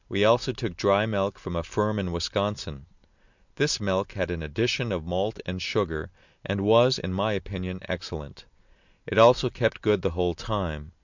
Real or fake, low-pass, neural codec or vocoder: real; 7.2 kHz; none